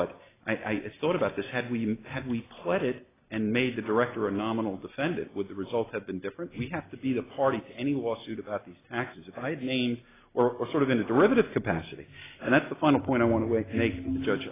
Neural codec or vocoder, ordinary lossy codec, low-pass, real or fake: none; AAC, 16 kbps; 3.6 kHz; real